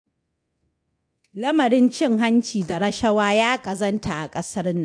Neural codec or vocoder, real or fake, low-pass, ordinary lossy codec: codec, 24 kHz, 0.9 kbps, DualCodec; fake; 9.9 kHz; AAC, 64 kbps